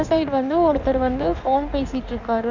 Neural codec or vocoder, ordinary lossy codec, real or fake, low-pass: codec, 16 kHz in and 24 kHz out, 1.1 kbps, FireRedTTS-2 codec; none; fake; 7.2 kHz